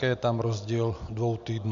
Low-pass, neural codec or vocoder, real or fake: 7.2 kHz; none; real